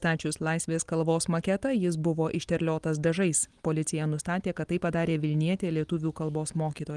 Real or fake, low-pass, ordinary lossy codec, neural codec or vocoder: real; 10.8 kHz; Opus, 24 kbps; none